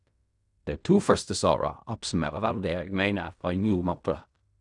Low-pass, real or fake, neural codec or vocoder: 10.8 kHz; fake; codec, 16 kHz in and 24 kHz out, 0.4 kbps, LongCat-Audio-Codec, fine tuned four codebook decoder